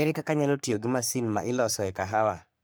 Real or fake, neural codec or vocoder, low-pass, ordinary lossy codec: fake; codec, 44.1 kHz, 3.4 kbps, Pupu-Codec; none; none